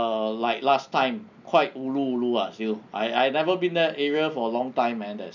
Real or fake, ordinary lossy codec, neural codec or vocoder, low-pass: real; none; none; 7.2 kHz